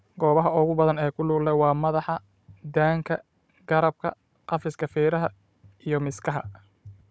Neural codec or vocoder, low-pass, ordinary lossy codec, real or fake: codec, 16 kHz, 16 kbps, FunCodec, trained on Chinese and English, 50 frames a second; none; none; fake